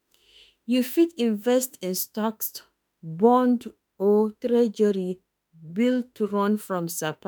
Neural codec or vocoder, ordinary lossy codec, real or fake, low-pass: autoencoder, 48 kHz, 32 numbers a frame, DAC-VAE, trained on Japanese speech; none; fake; none